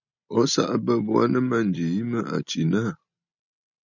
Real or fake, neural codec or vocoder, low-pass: real; none; 7.2 kHz